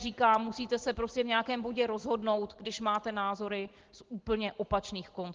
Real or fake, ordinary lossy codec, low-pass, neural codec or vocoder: real; Opus, 16 kbps; 7.2 kHz; none